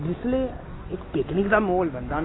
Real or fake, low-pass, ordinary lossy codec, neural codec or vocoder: real; 7.2 kHz; AAC, 16 kbps; none